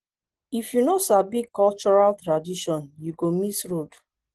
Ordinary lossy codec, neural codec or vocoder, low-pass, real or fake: Opus, 16 kbps; none; 10.8 kHz; real